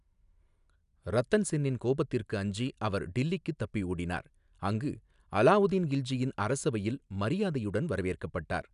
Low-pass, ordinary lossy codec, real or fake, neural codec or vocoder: 10.8 kHz; none; real; none